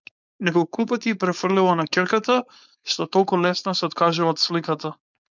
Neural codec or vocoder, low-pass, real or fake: codec, 16 kHz, 4.8 kbps, FACodec; 7.2 kHz; fake